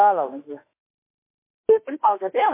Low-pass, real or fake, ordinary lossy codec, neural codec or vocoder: 3.6 kHz; fake; MP3, 24 kbps; autoencoder, 48 kHz, 32 numbers a frame, DAC-VAE, trained on Japanese speech